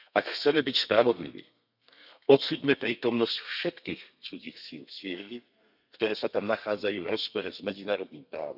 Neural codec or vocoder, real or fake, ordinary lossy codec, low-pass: codec, 32 kHz, 1.9 kbps, SNAC; fake; none; 5.4 kHz